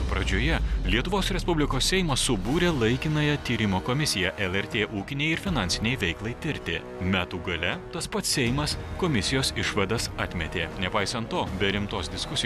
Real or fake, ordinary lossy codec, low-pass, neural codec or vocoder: real; MP3, 96 kbps; 14.4 kHz; none